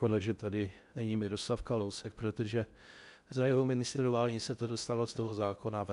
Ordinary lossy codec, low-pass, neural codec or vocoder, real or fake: AAC, 96 kbps; 10.8 kHz; codec, 16 kHz in and 24 kHz out, 0.6 kbps, FocalCodec, streaming, 4096 codes; fake